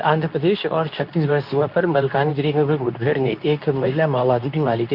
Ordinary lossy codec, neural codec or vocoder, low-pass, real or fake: none; codec, 24 kHz, 0.9 kbps, WavTokenizer, medium speech release version 2; 5.4 kHz; fake